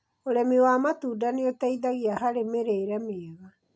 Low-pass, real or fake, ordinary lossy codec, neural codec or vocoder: none; real; none; none